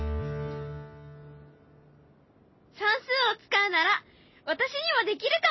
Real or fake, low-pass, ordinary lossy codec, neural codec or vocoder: real; 7.2 kHz; MP3, 24 kbps; none